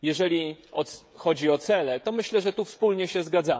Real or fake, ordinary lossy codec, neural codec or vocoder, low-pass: fake; none; codec, 16 kHz, 16 kbps, FreqCodec, smaller model; none